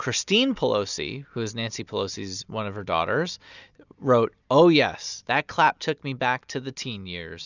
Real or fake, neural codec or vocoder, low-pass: real; none; 7.2 kHz